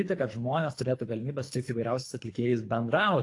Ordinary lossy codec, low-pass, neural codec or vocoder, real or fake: AAC, 48 kbps; 10.8 kHz; codec, 24 kHz, 3 kbps, HILCodec; fake